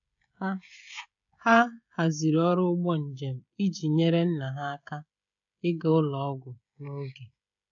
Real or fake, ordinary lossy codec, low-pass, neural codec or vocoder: fake; MP3, 96 kbps; 7.2 kHz; codec, 16 kHz, 16 kbps, FreqCodec, smaller model